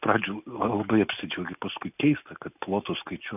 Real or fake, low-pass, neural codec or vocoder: real; 3.6 kHz; none